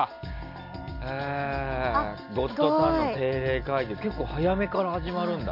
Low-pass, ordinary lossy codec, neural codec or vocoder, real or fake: 5.4 kHz; none; none; real